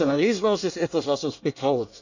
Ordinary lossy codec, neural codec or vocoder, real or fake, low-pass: none; codec, 24 kHz, 1 kbps, SNAC; fake; 7.2 kHz